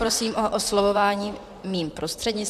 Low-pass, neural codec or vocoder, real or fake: 14.4 kHz; vocoder, 44.1 kHz, 128 mel bands, Pupu-Vocoder; fake